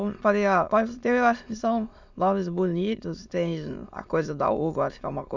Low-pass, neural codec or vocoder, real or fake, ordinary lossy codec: 7.2 kHz; autoencoder, 22.05 kHz, a latent of 192 numbers a frame, VITS, trained on many speakers; fake; none